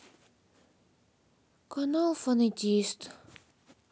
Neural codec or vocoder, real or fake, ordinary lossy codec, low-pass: none; real; none; none